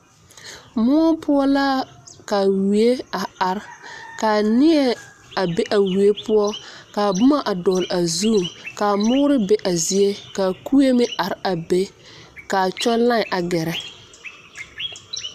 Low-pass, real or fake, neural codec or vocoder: 14.4 kHz; real; none